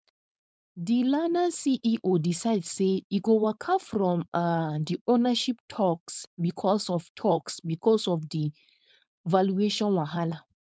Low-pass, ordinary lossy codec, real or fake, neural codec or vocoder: none; none; fake; codec, 16 kHz, 4.8 kbps, FACodec